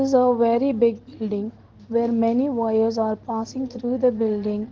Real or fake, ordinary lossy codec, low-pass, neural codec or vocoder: real; Opus, 16 kbps; 7.2 kHz; none